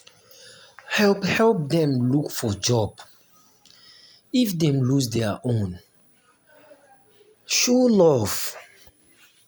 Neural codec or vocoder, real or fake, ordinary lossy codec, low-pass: none; real; none; none